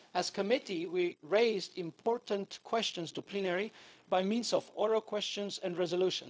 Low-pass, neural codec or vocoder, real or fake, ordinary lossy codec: none; codec, 16 kHz, 0.4 kbps, LongCat-Audio-Codec; fake; none